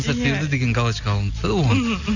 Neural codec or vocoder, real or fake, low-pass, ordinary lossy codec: none; real; 7.2 kHz; none